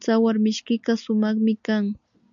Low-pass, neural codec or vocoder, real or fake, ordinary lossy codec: 7.2 kHz; none; real; MP3, 96 kbps